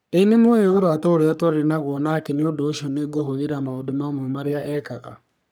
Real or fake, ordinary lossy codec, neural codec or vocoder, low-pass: fake; none; codec, 44.1 kHz, 3.4 kbps, Pupu-Codec; none